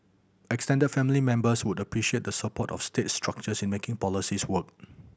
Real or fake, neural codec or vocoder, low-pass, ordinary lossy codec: real; none; none; none